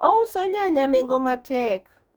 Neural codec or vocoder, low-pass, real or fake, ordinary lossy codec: codec, 44.1 kHz, 2.6 kbps, DAC; none; fake; none